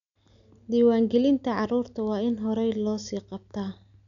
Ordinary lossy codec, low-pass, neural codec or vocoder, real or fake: none; 7.2 kHz; none; real